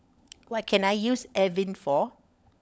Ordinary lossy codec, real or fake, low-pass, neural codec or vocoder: none; fake; none; codec, 16 kHz, 16 kbps, FunCodec, trained on LibriTTS, 50 frames a second